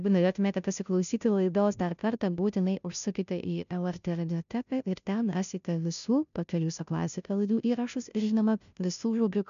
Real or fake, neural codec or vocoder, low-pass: fake; codec, 16 kHz, 0.5 kbps, FunCodec, trained on Chinese and English, 25 frames a second; 7.2 kHz